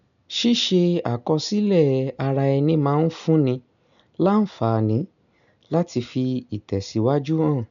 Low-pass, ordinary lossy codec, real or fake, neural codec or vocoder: 7.2 kHz; none; real; none